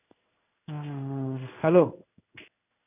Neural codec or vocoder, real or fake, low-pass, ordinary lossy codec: codec, 16 kHz in and 24 kHz out, 1 kbps, XY-Tokenizer; fake; 3.6 kHz; none